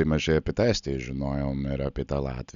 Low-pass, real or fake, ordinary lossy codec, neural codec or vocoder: 7.2 kHz; real; MP3, 96 kbps; none